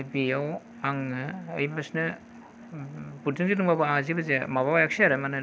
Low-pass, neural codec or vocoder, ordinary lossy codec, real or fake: none; none; none; real